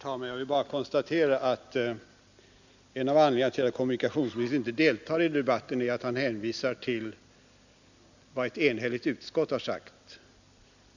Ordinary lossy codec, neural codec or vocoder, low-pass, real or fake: none; none; 7.2 kHz; real